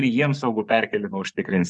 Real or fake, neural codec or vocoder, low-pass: fake; vocoder, 24 kHz, 100 mel bands, Vocos; 10.8 kHz